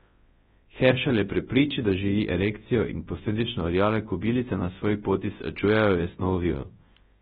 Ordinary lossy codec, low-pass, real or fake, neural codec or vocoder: AAC, 16 kbps; 10.8 kHz; fake; codec, 24 kHz, 0.9 kbps, WavTokenizer, large speech release